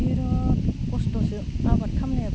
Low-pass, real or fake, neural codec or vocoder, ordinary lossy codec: none; real; none; none